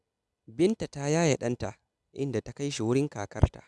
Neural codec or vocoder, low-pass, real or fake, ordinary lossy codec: none; none; real; none